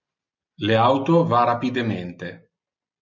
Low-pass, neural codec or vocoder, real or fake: 7.2 kHz; none; real